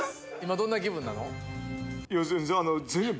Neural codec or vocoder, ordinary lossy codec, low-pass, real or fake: none; none; none; real